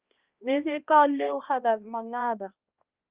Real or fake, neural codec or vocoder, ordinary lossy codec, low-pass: fake; codec, 16 kHz, 1 kbps, X-Codec, HuBERT features, trained on balanced general audio; Opus, 24 kbps; 3.6 kHz